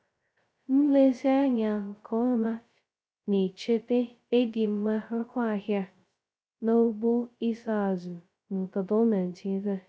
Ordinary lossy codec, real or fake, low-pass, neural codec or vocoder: none; fake; none; codec, 16 kHz, 0.2 kbps, FocalCodec